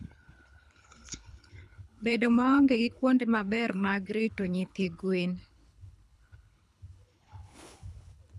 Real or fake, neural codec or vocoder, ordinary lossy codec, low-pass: fake; codec, 24 kHz, 3 kbps, HILCodec; none; none